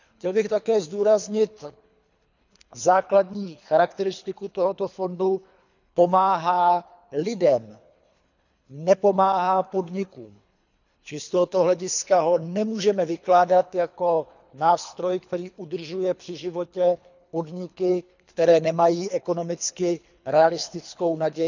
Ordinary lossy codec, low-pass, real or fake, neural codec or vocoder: none; 7.2 kHz; fake; codec, 24 kHz, 3 kbps, HILCodec